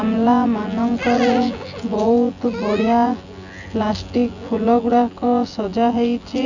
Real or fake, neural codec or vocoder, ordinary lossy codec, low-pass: fake; vocoder, 24 kHz, 100 mel bands, Vocos; none; 7.2 kHz